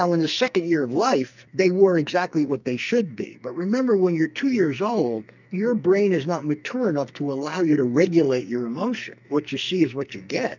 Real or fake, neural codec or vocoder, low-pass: fake; codec, 44.1 kHz, 2.6 kbps, SNAC; 7.2 kHz